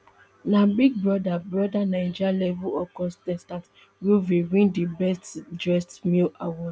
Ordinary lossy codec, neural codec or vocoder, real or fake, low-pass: none; none; real; none